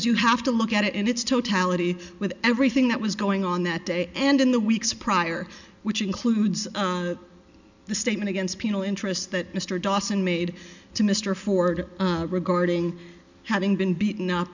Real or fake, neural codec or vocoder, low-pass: real; none; 7.2 kHz